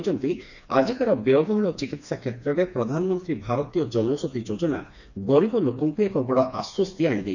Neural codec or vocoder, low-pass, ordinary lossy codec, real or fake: codec, 16 kHz, 2 kbps, FreqCodec, smaller model; 7.2 kHz; none; fake